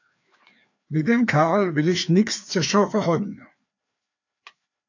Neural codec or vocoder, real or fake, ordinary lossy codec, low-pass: codec, 16 kHz, 2 kbps, FreqCodec, larger model; fake; AAC, 48 kbps; 7.2 kHz